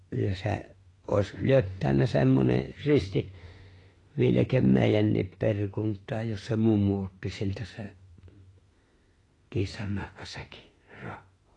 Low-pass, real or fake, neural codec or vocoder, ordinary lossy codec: 10.8 kHz; fake; autoencoder, 48 kHz, 32 numbers a frame, DAC-VAE, trained on Japanese speech; AAC, 32 kbps